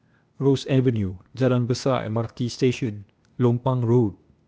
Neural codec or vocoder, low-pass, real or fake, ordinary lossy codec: codec, 16 kHz, 0.8 kbps, ZipCodec; none; fake; none